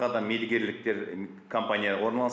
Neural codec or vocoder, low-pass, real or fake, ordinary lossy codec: none; none; real; none